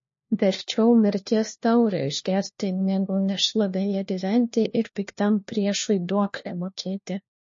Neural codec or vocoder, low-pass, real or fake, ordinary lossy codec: codec, 16 kHz, 1 kbps, FunCodec, trained on LibriTTS, 50 frames a second; 7.2 kHz; fake; MP3, 32 kbps